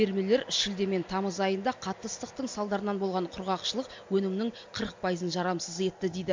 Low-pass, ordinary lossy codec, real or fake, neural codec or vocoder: 7.2 kHz; MP3, 48 kbps; real; none